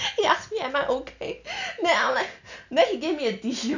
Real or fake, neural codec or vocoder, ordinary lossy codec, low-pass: real; none; none; 7.2 kHz